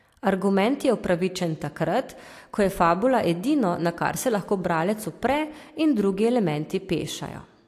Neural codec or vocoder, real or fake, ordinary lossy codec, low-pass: none; real; AAC, 64 kbps; 14.4 kHz